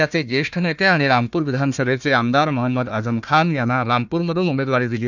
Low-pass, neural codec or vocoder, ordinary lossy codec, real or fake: 7.2 kHz; codec, 16 kHz, 1 kbps, FunCodec, trained on Chinese and English, 50 frames a second; none; fake